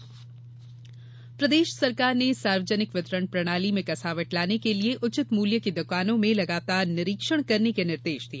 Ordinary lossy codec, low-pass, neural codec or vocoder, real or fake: none; none; none; real